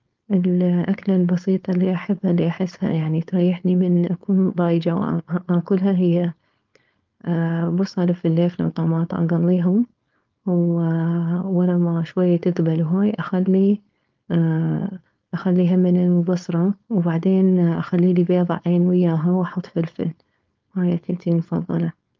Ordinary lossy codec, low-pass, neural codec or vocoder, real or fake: Opus, 24 kbps; 7.2 kHz; codec, 16 kHz, 4.8 kbps, FACodec; fake